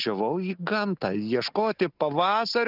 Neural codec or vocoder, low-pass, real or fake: none; 5.4 kHz; real